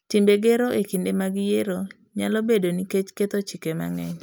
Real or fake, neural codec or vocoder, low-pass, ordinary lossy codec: real; none; none; none